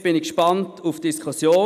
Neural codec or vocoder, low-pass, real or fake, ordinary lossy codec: none; 14.4 kHz; real; none